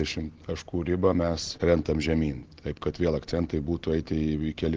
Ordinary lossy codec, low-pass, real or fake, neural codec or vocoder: Opus, 16 kbps; 7.2 kHz; real; none